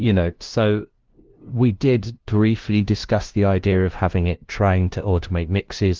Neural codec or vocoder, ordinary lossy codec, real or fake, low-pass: codec, 16 kHz, 0.5 kbps, FunCodec, trained on LibriTTS, 25 frames a second; Opus, 16 kbps; fake; 7.2 kHz